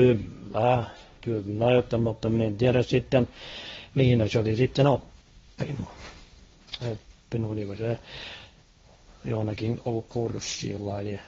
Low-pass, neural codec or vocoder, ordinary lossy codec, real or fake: 7.2 kHz; codec, 16 kHz, 1.1 kbps, Voila-Tokenizer; AAC, 24 kbps; fake